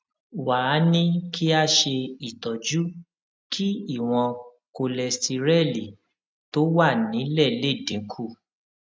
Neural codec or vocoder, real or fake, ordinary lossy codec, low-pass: none; real; none; none